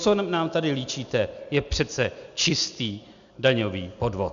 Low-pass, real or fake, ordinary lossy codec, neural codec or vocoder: 7.2 kHz; real; MP3, 96 kbps; none